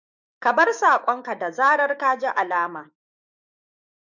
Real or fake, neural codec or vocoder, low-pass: fake; autoencoder, 48 kHz, 128 numbers a frame, DAC-VAE, trained on Japanese speech; 7.2 kHz